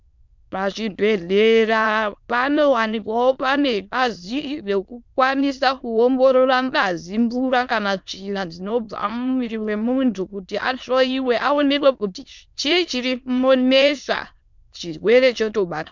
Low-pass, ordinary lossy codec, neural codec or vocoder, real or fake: 7.2 kHz; MP3, 64 kbps; autoencoder, 22.05 kHz, a latent of 192 numbers a frame, VITS, trained on many speakers; fake